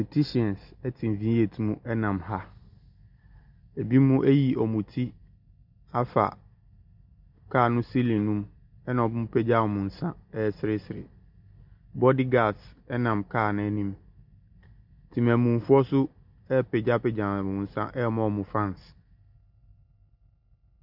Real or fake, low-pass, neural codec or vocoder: real; 5.4 kHz; none